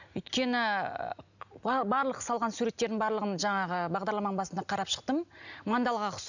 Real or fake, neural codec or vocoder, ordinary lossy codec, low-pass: real; none; none; 7.2 kHz